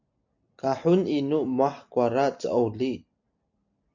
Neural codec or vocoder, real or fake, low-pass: none; real; 7.2 kHz